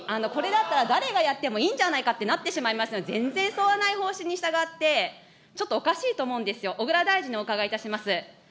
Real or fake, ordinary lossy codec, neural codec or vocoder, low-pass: real; none; none; none